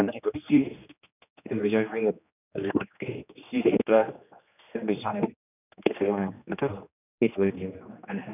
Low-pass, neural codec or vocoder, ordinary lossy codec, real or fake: 3.6 kHz; codec, 16 kHz, 2 kbps, X-Codec, HuBERT features, trained on general audio; none; fake